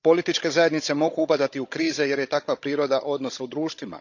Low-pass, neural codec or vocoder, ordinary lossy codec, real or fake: 7.2 kHz; codec, 16 kHz, 16 kbps, FunCodec, trained on Chinese and English, 50 frames a second; none; fake